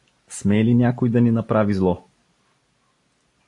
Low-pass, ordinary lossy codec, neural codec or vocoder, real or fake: 10.8 kHz; AAC, 48 kbps; none; real